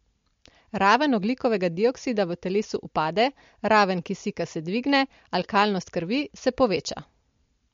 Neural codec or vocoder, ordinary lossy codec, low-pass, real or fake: none; MP3, 48 kbps; 7.2 kHz; real